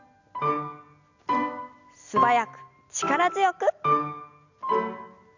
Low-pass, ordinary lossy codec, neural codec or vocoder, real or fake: 7.2 kHz; none; none; real